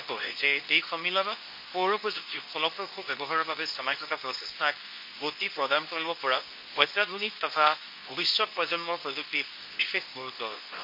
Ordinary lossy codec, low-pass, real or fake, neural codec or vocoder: MP3, 32 kbps; 5.4 kHz; fake; codec, 24 kHz, 0.9 kbps, WavTokenizer, medium speech release version 2